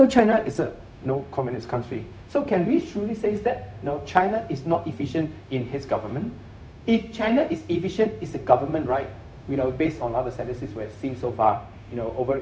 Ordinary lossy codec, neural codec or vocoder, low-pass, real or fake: none; codec, 16 kHz, 0.4 kbps, LongCat-Audio-Codec; none; fake